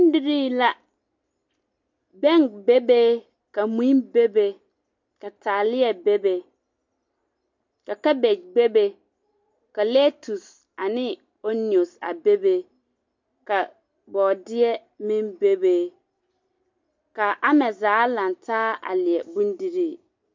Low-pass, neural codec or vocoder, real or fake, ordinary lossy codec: 7.2 kHz; none; real; MP3, 64 kbps